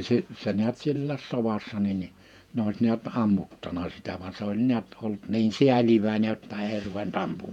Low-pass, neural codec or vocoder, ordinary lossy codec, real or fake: 19.8 kHz; vocoder, 48 kHz, 128 mel bands, Vocos; none; fake